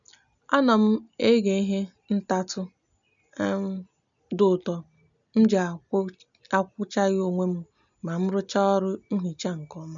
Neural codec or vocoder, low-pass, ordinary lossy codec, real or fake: none; 7.2 kHz; none; real